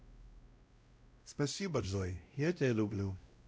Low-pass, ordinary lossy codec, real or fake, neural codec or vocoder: none; none; fake; codec, 16 kHz, 0.5 kbps, X-Codec, WavLM features, trained on Multilingual LibriSpeech